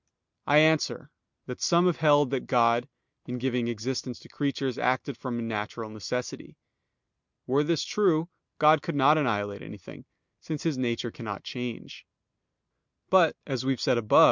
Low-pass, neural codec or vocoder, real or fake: 7.2 kHz; none; real